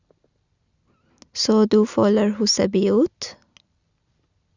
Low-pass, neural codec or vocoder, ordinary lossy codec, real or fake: 7.2 kHz; none; Opus, 64 kbps; real